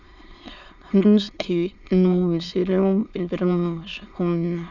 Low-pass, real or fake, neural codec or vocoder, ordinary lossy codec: 7.2 kHz; fake; autoencoder, 22.05 kHz, a latent of 192 numbers a frame, VITS, trained on many speakers; none